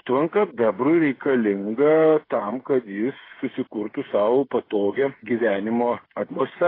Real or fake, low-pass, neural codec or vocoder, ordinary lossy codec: fake; 5.4 kHz; codec, 16 kHz, 8 kbps, FreqCodec, smaller model; AAC, 24 kbps